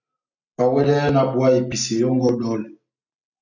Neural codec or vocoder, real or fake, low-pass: none; real; 7.2 kHz